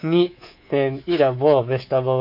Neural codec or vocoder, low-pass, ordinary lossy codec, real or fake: codec, 24 kHz, 3.1 kbps, DualCodec; 5.4 kHz; none; fake